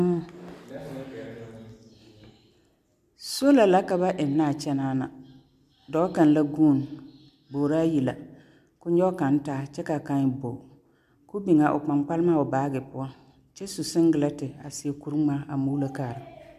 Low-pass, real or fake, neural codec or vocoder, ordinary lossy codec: 14.4 kHz; real; none; MP3, 96 kbps